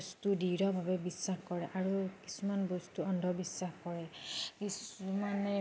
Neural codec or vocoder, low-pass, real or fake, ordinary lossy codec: none; none; real; none